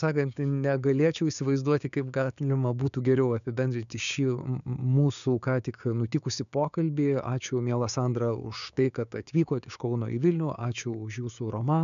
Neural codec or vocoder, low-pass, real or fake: codec, 16 kHz, 6 kbps, DAC; 7.2 kHz; fake